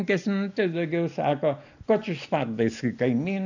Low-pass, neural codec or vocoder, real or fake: 7.2 kHz; none; real